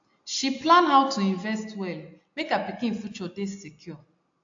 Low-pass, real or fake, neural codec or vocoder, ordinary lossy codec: 7.2 kHz; real; none; AAC, 48 kbps